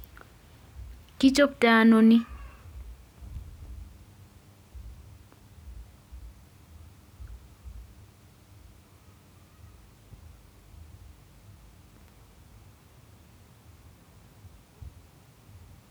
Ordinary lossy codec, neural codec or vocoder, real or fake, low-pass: none; none; real; none